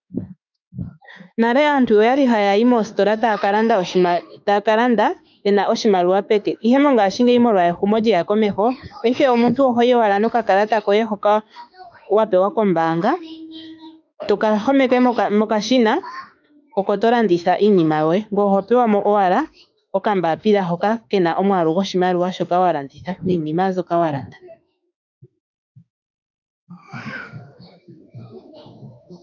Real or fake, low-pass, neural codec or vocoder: fake; 7.2 kHz; autoencoder, 48 kHz, 32 numbers a frame, DAC-VAE, trained on Japanese speech